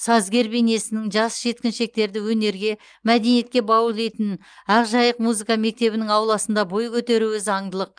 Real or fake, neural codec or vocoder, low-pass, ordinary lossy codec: real; none; 9.9 kHz; Opus, 32 kbps